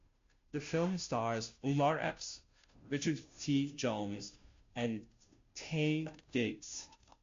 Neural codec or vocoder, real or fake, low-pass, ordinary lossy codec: codec, 16 kHz, 0.5 kbps, FunCodec, trained on Chinese and English, 25 frames a second; fake; 7.2 kHz; MP3, 48 kbps